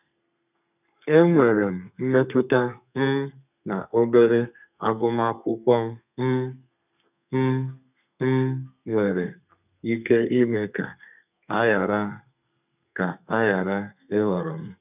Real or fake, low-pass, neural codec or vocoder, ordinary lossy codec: fake; 3.6 kHz; codec, 32 kHz, 1.9 kbps, SNAC; none